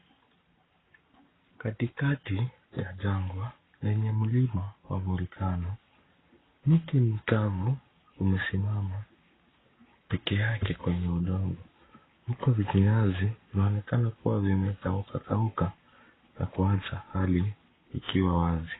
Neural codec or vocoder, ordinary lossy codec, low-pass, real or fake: codec, 44.1 kHz, 7.8 kbps, Pupu-Codec; AAC, 16 kbps; 7.2 kHz; fake